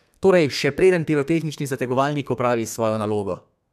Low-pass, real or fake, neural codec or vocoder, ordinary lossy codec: 14.4 kHz; fake; codec, 32 kHz, 1.9 kbps, SNAC; none